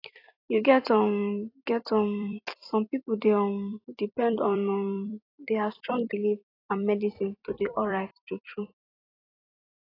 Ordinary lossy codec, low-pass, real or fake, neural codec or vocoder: AAC, 24 kbps; 5.4 kHz; fake; vocoder, 44.1 kHz, 128 mel bands every 256 samples, BigVGAN v2